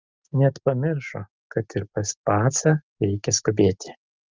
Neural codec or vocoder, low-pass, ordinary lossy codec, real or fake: none; 7.2 kHz; Opus, 24 kbps; real